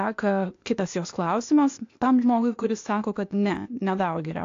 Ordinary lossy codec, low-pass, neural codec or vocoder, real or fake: AAC, 48 kbps; 7.2 kHz; codec, 16 kHz, 0.8 kbps, ZipCodec; fake